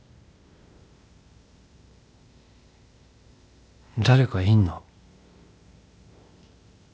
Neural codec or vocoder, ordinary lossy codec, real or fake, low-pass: codec, 16 kHz, 0.8 kbps, ZipCodec; none; fake; none